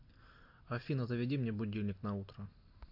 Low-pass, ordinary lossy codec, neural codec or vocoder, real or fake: 5.4 kHz; AAC, 48 kbps; none; real